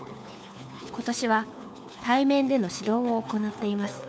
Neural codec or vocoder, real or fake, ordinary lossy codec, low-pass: codec, 16 kHz, 4 kbps, FunCodec, trained on LibriTTS, 50 frames a second; fake; none; none